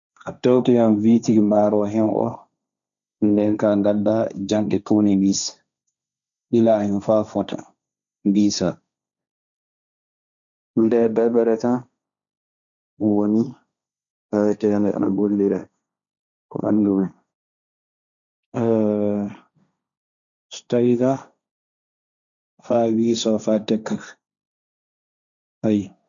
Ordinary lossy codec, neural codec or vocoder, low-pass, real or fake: none; codec, 16 kHz, 1.1 kbps, Voila-Tokenizer; 7.2 kHz; fake